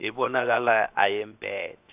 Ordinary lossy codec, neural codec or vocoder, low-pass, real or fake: AAC, 32 kbps; codec, 16 kHz, 0.7 kbps, FocalCodec; 3.6 kHz; fake